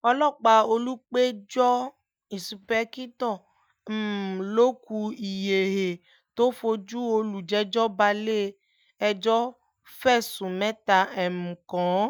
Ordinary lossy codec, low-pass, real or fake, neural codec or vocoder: none; none; real; none